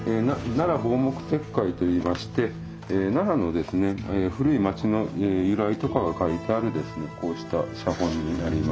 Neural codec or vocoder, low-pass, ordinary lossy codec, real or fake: none; none; none; real